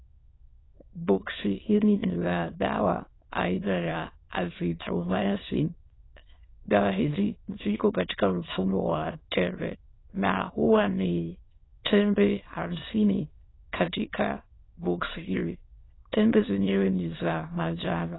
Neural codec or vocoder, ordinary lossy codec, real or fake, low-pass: autoencoder, 22.05 kHz, a latent of 192 numbers a frame, VITS, trained on many speakers; AAC, 16 kbps; fake; 7.2 kHz